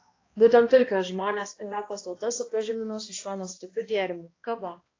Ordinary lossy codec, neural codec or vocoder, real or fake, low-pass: AAC, 32 kbps; codec, 16 kHz, 1 kbps, X-Codec, HuBERT features, trained on balanced general audio; fake; 7.2 kHz